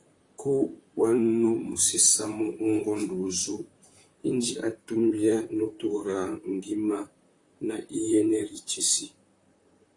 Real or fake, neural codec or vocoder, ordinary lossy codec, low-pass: fake; vocoder, 44.1 kHz, 128 mel bands, Pupu-Vocoder; AAC, 48 kbps; 10.8 kHz